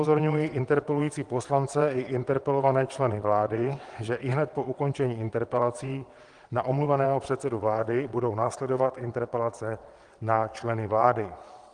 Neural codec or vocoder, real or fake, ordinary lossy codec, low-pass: vocoder, 22.05 kHz, 80 mel bands, WaveNeXt; fake; Opus, 24 kbps; 9.9 kHz